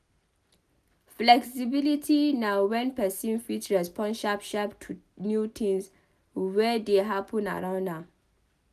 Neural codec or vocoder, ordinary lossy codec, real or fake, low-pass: none; none; real; 14.4 kHz